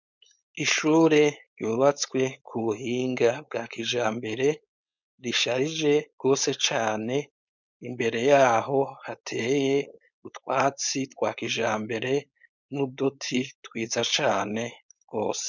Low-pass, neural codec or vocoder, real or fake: 7.2 kHz; codec, 16 kHz, 4.8 kbps, FACodec; fake